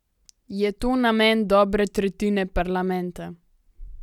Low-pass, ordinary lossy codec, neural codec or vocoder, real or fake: 19.8 kHz; none; none; real